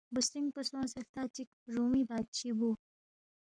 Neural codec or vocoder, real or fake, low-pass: codec, 44.1 kHz, 7.8 kbps, Pupu-Codec; fake; 9.9 kHz